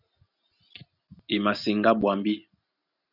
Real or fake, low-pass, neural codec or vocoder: real; 5.4 kHz; none